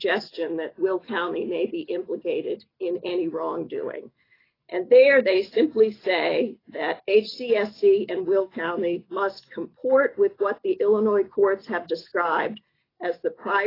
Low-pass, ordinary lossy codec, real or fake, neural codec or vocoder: 5.4 kHz; AAC, 24 kbps; real; none